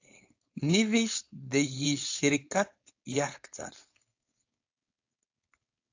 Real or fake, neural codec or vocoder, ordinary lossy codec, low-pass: fake; codec, 16 kHz, 4.8 kbps, FACodec; AAC, 48 kbps; 7.2 kHz